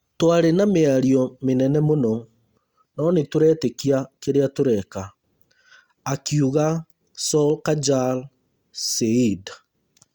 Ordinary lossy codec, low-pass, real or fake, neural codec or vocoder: Opus, 64 kbps; 19.8 kHz; real; none